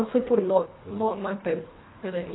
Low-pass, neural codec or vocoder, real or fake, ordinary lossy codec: 7.2 kHz; codec, 24 kHz, 1.5 kbps, HILCodec; fake; AAC, 16 kbps